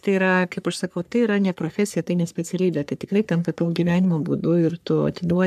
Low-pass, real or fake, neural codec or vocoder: 14.4 kHz; fake; codec, 44.1 kHz, 3.4 kbps, Pupu-Codec